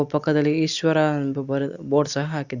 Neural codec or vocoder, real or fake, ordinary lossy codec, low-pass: none; real; Opus, 64 kbps; 7.2 kHz